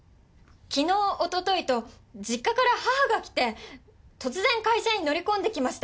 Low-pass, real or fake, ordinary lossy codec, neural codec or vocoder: none; real; none; none